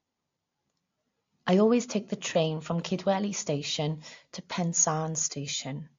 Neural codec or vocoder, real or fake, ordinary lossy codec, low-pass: none; real; AAC, 48 kbps; 7.2 kHz